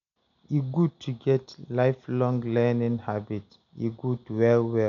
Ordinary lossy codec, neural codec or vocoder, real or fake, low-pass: none; none; real; 7.2 kHz